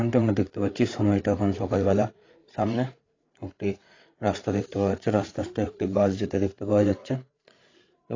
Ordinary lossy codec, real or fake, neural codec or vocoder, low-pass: AAC, 32 kbps; fake; codec, 16 kHz, 8 kbps, FreqCodec, larger model; 7.2 kHz